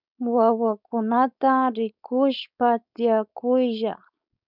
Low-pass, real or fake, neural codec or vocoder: 5.4 kHz; fake; codec, 16 kHz, 4.8 kbps, FACodec